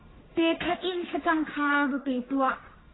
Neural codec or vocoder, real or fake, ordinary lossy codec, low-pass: codec, 44.1 kHz, 1.7 kbps, Pupu-Codec; fake; AAC, 16 kbps; 7.2 kHz